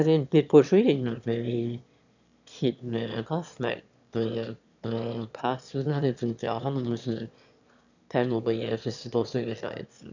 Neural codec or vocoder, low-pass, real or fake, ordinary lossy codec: autoencoder, 22.05 kHz, a latent of 192 numbers a frame, VITS, trained on one speaker; 7.2 kHz; fake; none